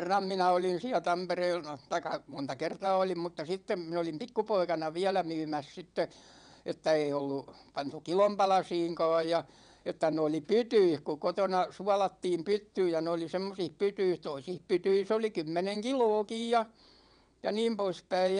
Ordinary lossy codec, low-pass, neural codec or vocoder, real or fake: none; 9.9 kHz; vocoder, 22.05 kHz, 80 mel bands, WaveNeXt; fake